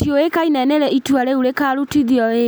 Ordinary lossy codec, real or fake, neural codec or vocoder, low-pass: none; real; none; none